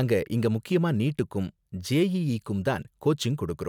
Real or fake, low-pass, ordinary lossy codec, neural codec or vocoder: real; 19.8 kHz; none; none